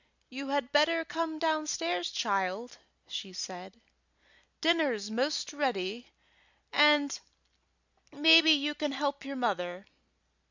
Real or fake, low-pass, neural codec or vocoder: real; 7.2 kHz; none